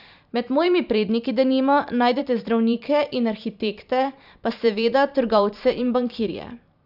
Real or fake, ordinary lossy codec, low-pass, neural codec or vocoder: fake; none; 5.4 kHz; vocoder, 44.1 kHz, 128 mel bands every 512 samples, BigVGAN v2